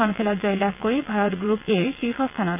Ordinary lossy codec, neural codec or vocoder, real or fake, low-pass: AAC, 32 kbps; vocoder, 22.05 kHz, 80 mel bands, WaveNeXt; fake; 3.6 kHz